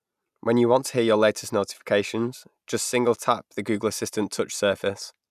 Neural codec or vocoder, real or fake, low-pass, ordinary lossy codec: none; real; 14.4 kHz; none